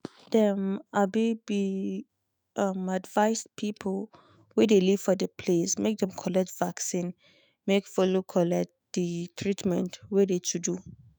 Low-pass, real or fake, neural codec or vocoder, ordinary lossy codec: none; fake; autoencoder, 48 kHz, 128 numbers a frame, DAC-VAE, trained on Japanese speech; none